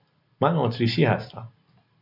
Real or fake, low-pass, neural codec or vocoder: real; 5.4 kHz; none